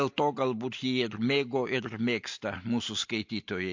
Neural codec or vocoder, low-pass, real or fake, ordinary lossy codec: none; 7.2 kHz; real; MP3, 48 kbps